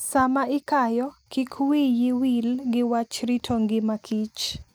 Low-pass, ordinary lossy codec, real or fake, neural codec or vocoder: none; none; real; none